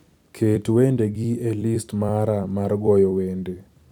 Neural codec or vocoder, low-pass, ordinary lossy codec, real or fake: vocoder, 44.1 kHz, 128 mel bands every 256 samples, BigVGAN v2; 19.8 kHz; none; fake